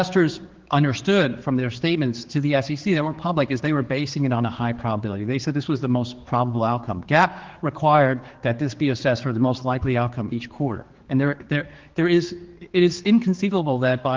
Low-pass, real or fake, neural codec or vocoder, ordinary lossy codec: 7.2 kHz; fake; codec, 16 kHz, 4 kbps, X-Codec, HuBERT features, trained on general audio; Opus, 16 kbps